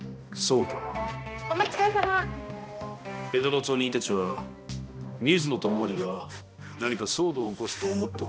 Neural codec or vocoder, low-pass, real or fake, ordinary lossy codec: codec, 16 kHz, 1 kbps, X-Codec, HuBERT features, trained on balanced general audio; none; fake; none